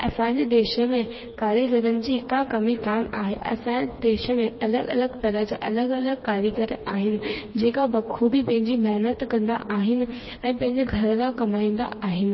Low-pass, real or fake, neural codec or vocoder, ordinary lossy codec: 7.2 kHz; fake; codec, 16 kHz, 2 kbps, FreqCodec, smaller model; MP3, 24 kbps